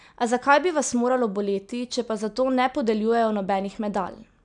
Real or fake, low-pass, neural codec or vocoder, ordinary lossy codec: real; 9.9 kHz; none; none